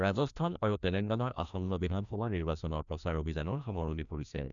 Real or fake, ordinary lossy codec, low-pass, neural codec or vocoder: fake; none; 7.2 kHz; codec, 16 kHz, 1 kbps, FreqCodec, larger model